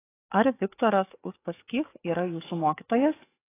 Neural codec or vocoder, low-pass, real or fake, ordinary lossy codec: codec, 44.1 kHz, 7.8 kbps, DAC; 3.6 kHz; fake; AAC, 16 kbps